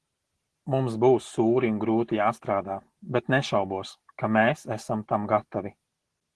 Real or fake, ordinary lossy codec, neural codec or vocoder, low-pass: real; Opus, 16 kbps; none; 10.8 kHz